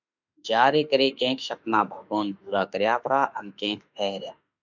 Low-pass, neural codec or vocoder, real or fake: 7.2 kHz; autoencoder, 48 kHz, 32 numbers a frame, DAC-VAE, trained on Japanese speech; fake